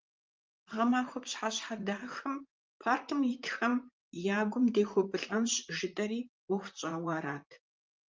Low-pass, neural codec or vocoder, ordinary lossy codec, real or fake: 7.2 kHz; vocoder, 44.1 kHz, 128 mel bands, Pupu-Vocoder; Opus, 32 kbps; fake